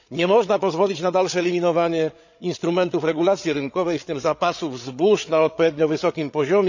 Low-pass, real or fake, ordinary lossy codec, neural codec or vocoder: 7.2 kHz; fake; none; codec, 16 kHz, 8 kbps, FreqCodec, larger model